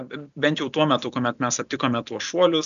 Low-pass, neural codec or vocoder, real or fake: 7.2 kHz; none; real